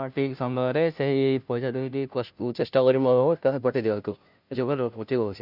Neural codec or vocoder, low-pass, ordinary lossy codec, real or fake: codec, 16 kHz, 0.5 kbps, FunCodec, trained on Chinese and English, 25 frames a second; 5.4 kHz; none; fake